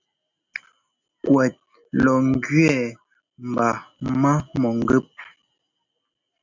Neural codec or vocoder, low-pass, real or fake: none; 7.2 kHz; real